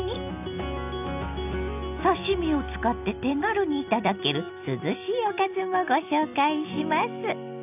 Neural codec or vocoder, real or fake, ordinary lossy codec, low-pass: none; real; none; 3.6 kHz